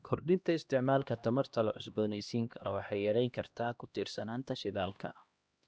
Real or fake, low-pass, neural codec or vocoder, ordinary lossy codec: fake; none; codec, 16 kHz, 1 kbps, X-Codec, HuBERT features, trained on LibriSpeech; none